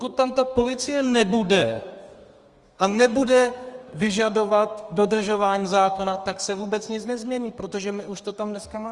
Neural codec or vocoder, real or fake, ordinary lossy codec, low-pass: codec, 32 kHz, 1.9 kbps, SNAC; fake; Opus, 32 kbps; 10.8 kHz